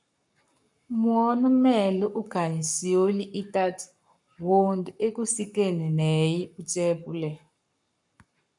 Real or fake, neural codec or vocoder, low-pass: fake; codec, 44.1 kHz, 7.8 kbps, Pupu-Codec; 10.8 kHz